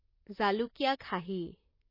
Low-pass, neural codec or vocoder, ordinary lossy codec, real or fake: 5.4 kHz; vocoder, 44.1 kHz, 128 mel bands, Pupu-Vocoder; MP3, 24 kbps; fake